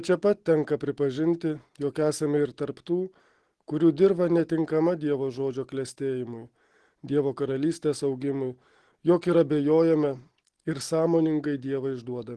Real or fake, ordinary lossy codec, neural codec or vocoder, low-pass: real; Opus, 16 kbps; none; 10.8 kHz